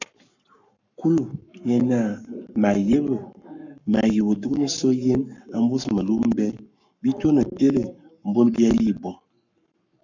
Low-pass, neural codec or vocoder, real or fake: 7.2 kHz; codec, 44.1 kHz, 7.8 kbps, Pupu-Codec; fake